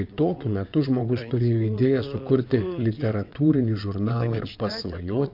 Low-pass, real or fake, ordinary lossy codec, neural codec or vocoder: 5.4 kHz; fake; MP3, 48 kbps; vocoder, 22.05 kHz, 80 mel bands, WaveNeXt